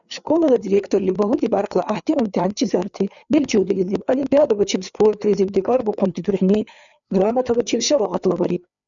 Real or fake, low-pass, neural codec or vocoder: fake; 7.2 kHz; codec, 16 kHz, 4 kbps, FreqCodec, larger model